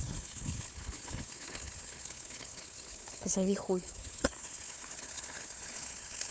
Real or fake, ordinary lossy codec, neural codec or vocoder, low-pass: fake; none; codec, 16 kHz, 4 kbps, FunCodec, trained on Chinese and English, 50 frames a second; none